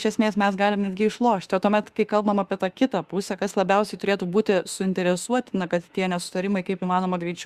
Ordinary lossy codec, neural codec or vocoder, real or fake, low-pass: Opus, 64 kbps; autoencoder, 48 kHz, 32 numbers a frame, DAC-VAE, trained on Japanese speech; fake; 14.4 kHz